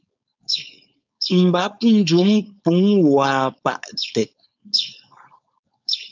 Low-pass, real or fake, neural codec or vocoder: 7.2 kHz; fake; codec, 16 kHz, 4.8 kbps, FACodec